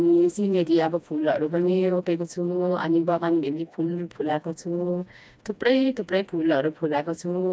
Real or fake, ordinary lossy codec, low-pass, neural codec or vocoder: fake; none; none; codec, 16 kHz, 1 kbps, FreqCodec, smaller model